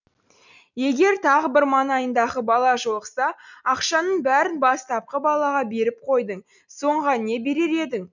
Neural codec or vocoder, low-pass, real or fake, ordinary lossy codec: none; 7.2 kHz; real; none